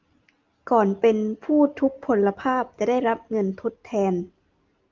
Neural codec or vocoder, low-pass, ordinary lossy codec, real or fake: none; 7.2 kHz; Opus, 32 kbps; real